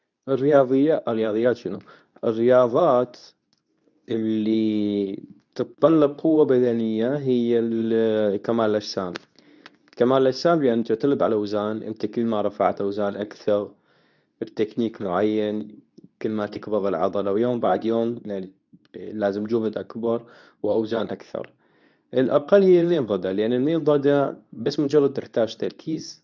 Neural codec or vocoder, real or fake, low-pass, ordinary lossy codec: codec, 24 kHz, 0.9 kbps, WavTokenizer, medium speech release version 2; fake; 7.2 kHz; none